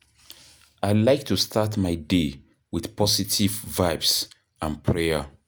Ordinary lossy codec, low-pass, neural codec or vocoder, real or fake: none; none; none; real